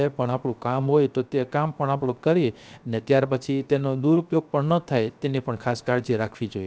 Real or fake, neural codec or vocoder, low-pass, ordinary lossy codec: fake; codec, 16 kHz, 0.7 kbps, FocalCodec; none; none